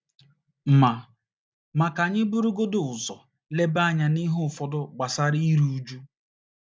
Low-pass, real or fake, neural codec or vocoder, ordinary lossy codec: none; real; none; none